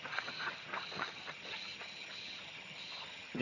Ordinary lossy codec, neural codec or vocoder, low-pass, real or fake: none; vocoder, 22.05 kHz, 80 mel bands, HiFi-GAN; 7.2 kHz; fake